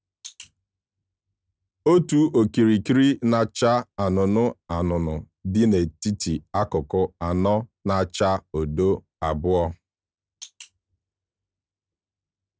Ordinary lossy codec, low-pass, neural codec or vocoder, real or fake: none; none; none; real